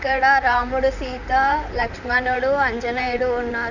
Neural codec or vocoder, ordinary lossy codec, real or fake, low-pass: vocoder, 44.1 kHz, 128 mel bands, Pupu-Vocoder; none; fake; 7.2 kHz